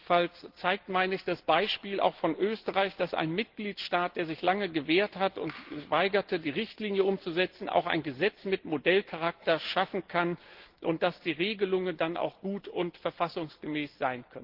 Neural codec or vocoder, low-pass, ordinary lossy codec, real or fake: none; 5.4 kHz; Opus, 16 kbps; real